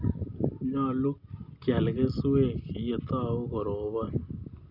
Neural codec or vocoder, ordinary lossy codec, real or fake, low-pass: none; none; real; 5.4 kHz